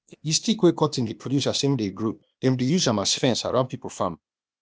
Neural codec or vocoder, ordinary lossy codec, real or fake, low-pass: codec, 16 kHz, 0.8 kbps, ZipCodec; none; fake; none